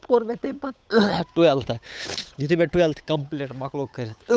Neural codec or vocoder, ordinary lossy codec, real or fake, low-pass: codec, 16 kHz, 8 kbps, FunCodec, trained on Chinese and English, 25 frames a second; none; fake; none